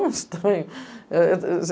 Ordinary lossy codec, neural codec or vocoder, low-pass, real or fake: none; none; none; real